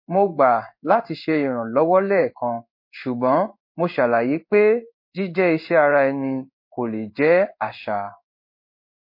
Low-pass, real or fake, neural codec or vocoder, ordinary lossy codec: 5.4 kHz; fake; codec, 16 kHz in and 24 kHz out, 1 kbps, XY-Tokenizer; MP3, 32 kbps